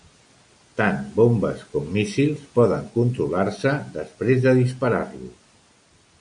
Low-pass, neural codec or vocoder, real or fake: 9.9 kHz; none; real